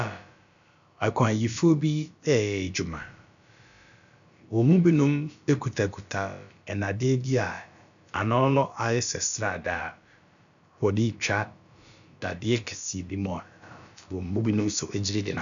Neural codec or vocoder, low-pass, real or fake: codec, 16 kHz, about 1 kbps, DyCAST, with the encoder's durations; 7.2 kHz; fake